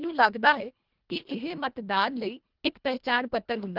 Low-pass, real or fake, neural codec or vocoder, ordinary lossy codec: 5.4 kHz; fake; codec, 24 kHz, 1.5 kbps, HILCodec; Opus, 32 kbps